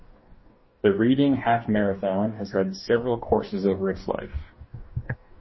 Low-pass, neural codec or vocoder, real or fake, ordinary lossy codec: 7.2 kHz; codec, 44.1 kHz, 2.6 kbps, DAC; fake; MP3, 24 kbps